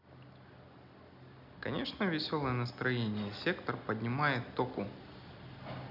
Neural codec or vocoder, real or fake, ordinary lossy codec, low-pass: none; real; none; 5.4 kHz